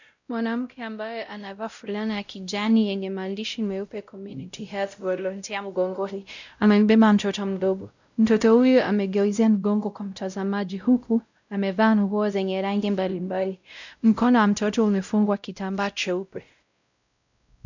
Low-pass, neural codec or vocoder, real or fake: 7.2 kHz; codec, 16 kHz, 0.5 kbps, X-Codec, WavLM features, trained on Multilingual LibriSpeech; fake